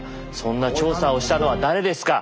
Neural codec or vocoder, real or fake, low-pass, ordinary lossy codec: none; real; none; none